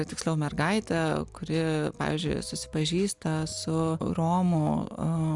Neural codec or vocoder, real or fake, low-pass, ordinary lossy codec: none; real; 10.8 kHz; Opus, 64 kbps